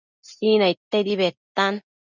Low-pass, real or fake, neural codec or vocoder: 7.2 kHz; real; none